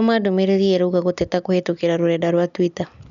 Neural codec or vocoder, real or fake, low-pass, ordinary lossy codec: none; real; 7.2 kHz; none